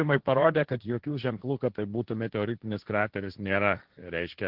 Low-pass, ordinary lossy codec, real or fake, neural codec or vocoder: 5.4 kHz; Opus, 16 kbps; fake; codec, 16 kHz, 1.1 kbps, Voila-Tokenizer